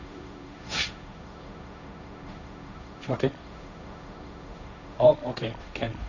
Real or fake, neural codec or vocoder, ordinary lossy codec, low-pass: fake; codec, 16 kHz, 1.1 kbps, Voila-Tokenizer; none; 7.2 kHz